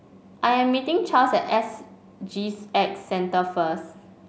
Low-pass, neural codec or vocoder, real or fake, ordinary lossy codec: none; none; real; none